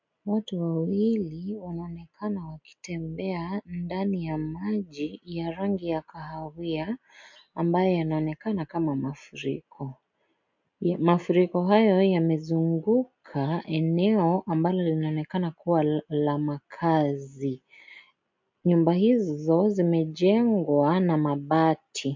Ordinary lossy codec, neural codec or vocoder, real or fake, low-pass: MP3, 64 kbps; none; real; 7.2 kHz